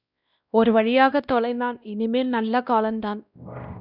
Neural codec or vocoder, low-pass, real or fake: codec, 16 kHz, 1 kbps, X-Codec, WavLM features, trained on Multilingual LibriSpeech; 5.4 kHz; fake